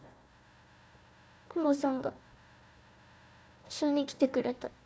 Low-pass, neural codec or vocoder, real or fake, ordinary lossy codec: none; codec, 16 kHz, 1 kbps, FunCodec, trained on Chinese and English, 50 frames a second; fake; none